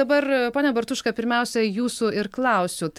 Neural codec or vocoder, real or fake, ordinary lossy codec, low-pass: none; real; MP3, 96 kbps; 19.8 kHz